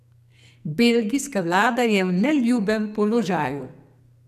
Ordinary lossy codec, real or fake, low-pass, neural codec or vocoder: none; fake; 14.4 kHz; codec, 44.1 kHz, 2.6 kbps, SNAC